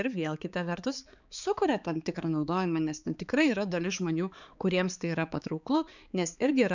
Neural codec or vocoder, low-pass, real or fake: codec, 16 kHz, 4 kbps, X-Codec, HuBERT features, trained on balanced general audio; 7.2 kHz; fake